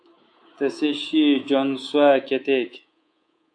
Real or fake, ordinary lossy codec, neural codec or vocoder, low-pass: fake; AAC, 64 kbps; codec, 24 kHz, 3.1 kbps, DualCodec; 9.9 kHz